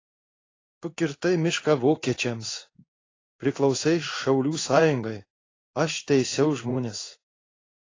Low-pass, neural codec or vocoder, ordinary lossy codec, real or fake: 7.2 kHz; codec, 16 kHz in and 24 kHz out, 1 kbps, XY-Tokenizer; AAC, 32 kbps; fake